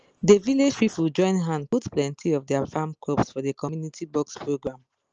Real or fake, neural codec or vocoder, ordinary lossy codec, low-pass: real; none; Opus, 24 kbps; 7.2 kHz